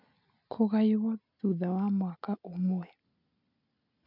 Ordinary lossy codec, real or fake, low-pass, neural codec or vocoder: none; real; 5.4 kHz; none